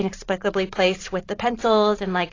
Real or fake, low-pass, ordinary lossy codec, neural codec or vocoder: fake; 7.2 kHz; AAC, 32 kbps; vocoder, 44.1 kHz, 128 mel bands every 512 samples, BigVGAN v2